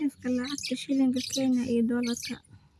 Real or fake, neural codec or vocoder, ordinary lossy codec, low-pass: real; none; none; none